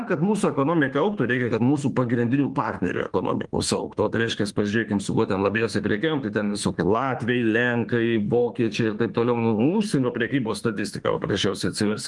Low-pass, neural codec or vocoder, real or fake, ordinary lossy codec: 10.8 kHz; autoencoder, 48 kHz, 32 numbers a frame, DAC-VAE, trained on Japanese speech; fake; Opus, 24 kbps